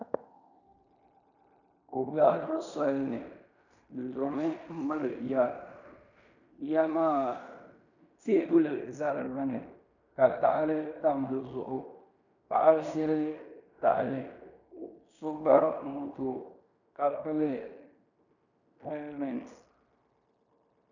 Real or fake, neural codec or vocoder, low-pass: fake; codec, 16 kHz in and 24 kHz out, 0.9 kbps, LongCat-Audio-Codec, fine tuned four codebook decoder; 7.2 kHz